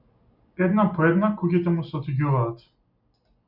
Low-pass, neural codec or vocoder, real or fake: 5.4 kHz; none; real